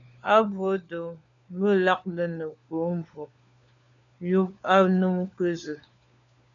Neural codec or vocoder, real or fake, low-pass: codec, 16 kHz, 4 kbps, FunCodec, trained on LibriTTS, 50 frames a second; fake; 7.2 kHz